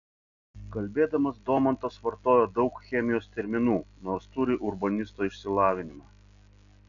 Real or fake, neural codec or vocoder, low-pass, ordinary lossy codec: real; none; 7.2 kHz; MP3, 96 kbps